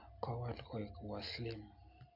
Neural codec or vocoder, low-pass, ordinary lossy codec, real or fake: none; 5.4 kHz; MP3, 48 kbps; real